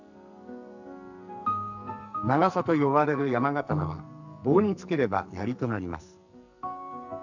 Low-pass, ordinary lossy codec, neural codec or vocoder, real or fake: 7.2 kHz; none; codec, 44.1 kHz, 2.6 kbps, SNAC; fake